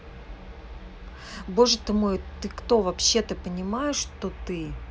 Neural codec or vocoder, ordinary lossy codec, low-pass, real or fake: none; none; none; real